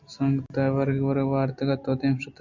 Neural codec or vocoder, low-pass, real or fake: none; 7.2 kHz; real